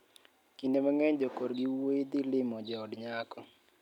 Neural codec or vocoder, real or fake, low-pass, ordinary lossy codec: none; real; 19.8 kHz; none